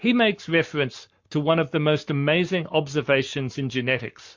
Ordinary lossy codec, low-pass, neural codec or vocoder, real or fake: MP3, 48 kbps; 7.2 kHz; codec, 44.1 kHz, 7.8 kbps, Pupu-Codec; fake